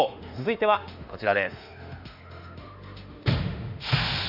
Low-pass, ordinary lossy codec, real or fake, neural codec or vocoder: 5.4 kHz; none; fake; autoencoder, 48 kHz, 32 numbers a frame, DAC-VAE, trained on Japanese speech